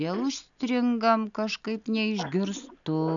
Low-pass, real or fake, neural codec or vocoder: 7.2 kHz; real; none